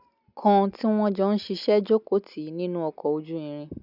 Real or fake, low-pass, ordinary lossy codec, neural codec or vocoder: real; 5.4 kHz; none; none